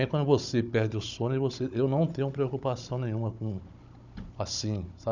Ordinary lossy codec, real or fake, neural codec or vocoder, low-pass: none; fake; codec, 16 kHz, 16 kbps, FunCodec, trained on Chinese and English, 50 frames a second; 7.2 kHz